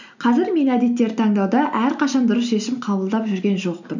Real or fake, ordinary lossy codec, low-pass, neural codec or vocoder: real; none; 7.2 kHz; none